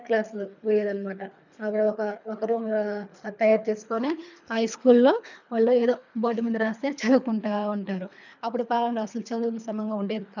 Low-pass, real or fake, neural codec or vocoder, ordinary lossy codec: 7.2 kHz; fake; codec, 24 kHz, 3 kbps, HILCodec; none